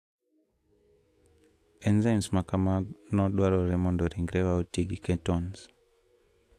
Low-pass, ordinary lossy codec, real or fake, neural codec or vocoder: 14.4 kHz; AAC, 64 kbps; fake; autoencoder, 48 kHz, 128 numbers a frame, DAC-VAE, trained on Japanese speech